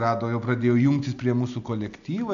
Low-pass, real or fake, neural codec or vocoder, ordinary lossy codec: 7.2 kHz; real; none; AAC, 96 kbps